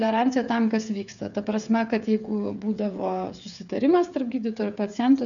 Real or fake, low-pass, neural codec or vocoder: fake; 7.2 kHz; codec, 16 kHz, 8 kbps, FreqCodec, smaller model